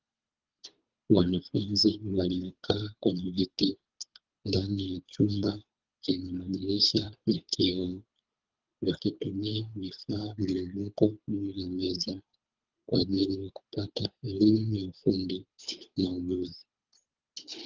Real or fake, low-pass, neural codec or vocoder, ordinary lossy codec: fake; 7.2 kHz; codec, 24 kHz, 3 kbps, HILCodec; Opus, 32 kbps